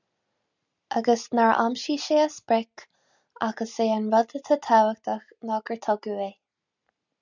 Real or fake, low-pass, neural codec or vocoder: real; 7.2 kHz; none